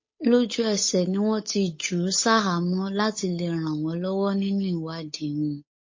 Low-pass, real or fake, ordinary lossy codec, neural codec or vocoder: 7.2 kHz; fake; MP3, 32 kbps; codec, 16 kHz, 8 kbps, FunCodec, trained on Chinese and English, 25 frames a second